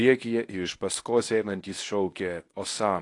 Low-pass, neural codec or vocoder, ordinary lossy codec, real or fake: 10.8 kHz; codec, 24 kHz, 0.9 kbps, WavTokenizer, medium speech release version 1; AAC, 48 kbps; fake